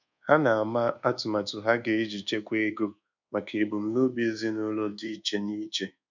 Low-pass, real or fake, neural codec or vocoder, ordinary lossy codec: 7.2 kHz; fake; codec, 24 kHz, 1.2 kbps, DualCodec; none